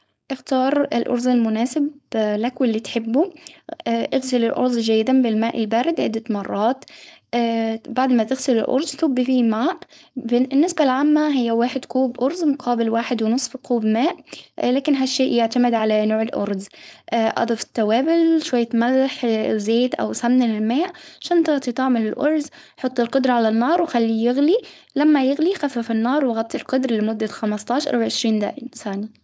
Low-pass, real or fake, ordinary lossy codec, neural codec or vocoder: none; fake; none; codec, 16 kHz, 4.8 kbps, FACodec